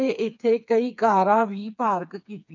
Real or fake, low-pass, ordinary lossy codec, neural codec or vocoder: fake; 7.2 kHz; none; codec, 16 kHz, 4 kbps, FreqCodec, smaller model